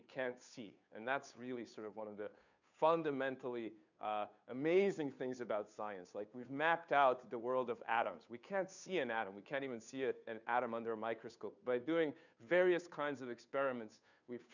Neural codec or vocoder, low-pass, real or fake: codec, 16 kHz, 2 kbps, FunCodec, trained on Chinese and English, 25 frames a second; 7.2 kHz; fake